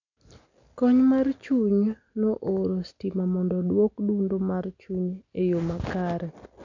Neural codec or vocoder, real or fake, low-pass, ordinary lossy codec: none; real; 7.2 kHz; none